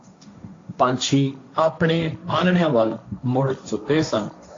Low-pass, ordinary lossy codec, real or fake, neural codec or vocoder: 7.2 kHz; AAC, 48 kbps; fake; codec, 16 kHz, 1.1 kbps, Voila-Tokenizer